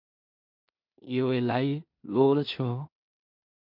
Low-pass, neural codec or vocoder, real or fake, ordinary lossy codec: 5.4 kHz; codec, 16 kHz in and 24 kHz out, 0.4 kbps, LongCat-Audio-Codec, two codebook decoder; fake; MP3, 48 kbps